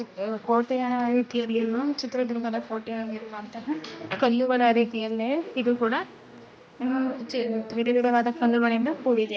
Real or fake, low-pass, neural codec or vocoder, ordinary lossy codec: fake; none; codec, 16 kHz, 1 kbps, X-Codec, HuBERT features, trained on general audio; none